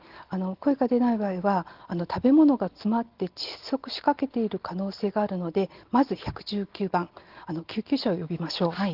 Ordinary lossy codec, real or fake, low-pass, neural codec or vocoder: Opus, 16 kbps; real; 5.4 kHz; none